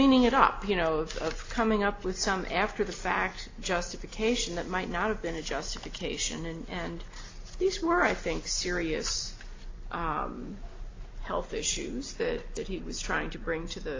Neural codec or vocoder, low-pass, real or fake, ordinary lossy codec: none; 7.2 kHz; real; AAC, 32 kbps